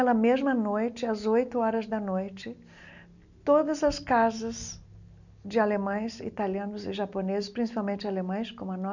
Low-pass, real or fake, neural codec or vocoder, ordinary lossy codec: 7.2 kHz; real; none; none